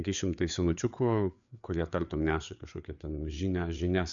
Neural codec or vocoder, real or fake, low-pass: codec, 16 kHz, 4 kbps, FreqCodec, larger model; fake; 7.2 kHz